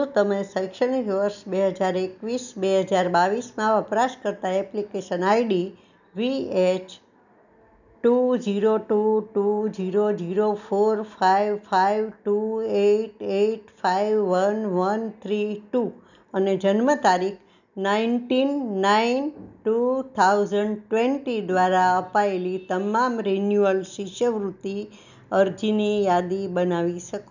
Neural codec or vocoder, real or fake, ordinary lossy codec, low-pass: none; real; none; 7.2 kHz